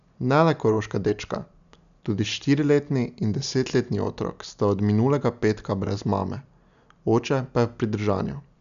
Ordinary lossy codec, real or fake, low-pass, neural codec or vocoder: none; real; 7.2 kHz; none